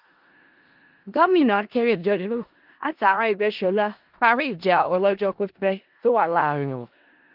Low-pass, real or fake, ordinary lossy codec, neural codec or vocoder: 5.4 kHz; fake; Opus, 32 kbps; codec, 16 kHz in and 24 kHz out, 0.4 kbps, LongCat-Audio-Codec, four codebook decoder